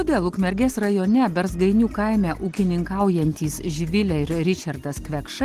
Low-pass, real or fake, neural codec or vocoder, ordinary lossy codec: 14.4 kHz; real; none; Opus, 16 kbps